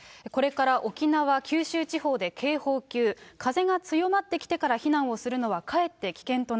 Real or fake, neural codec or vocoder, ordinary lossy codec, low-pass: real; none; none; none